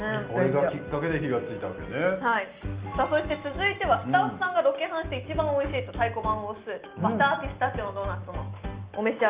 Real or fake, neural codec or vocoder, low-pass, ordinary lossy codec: real; none; 3.6 kHz; Opus, 24 kbps